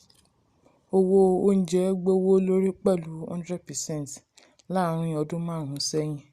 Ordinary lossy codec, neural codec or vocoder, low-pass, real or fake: Opus, 64 kbps; none; 14.4 kHz; real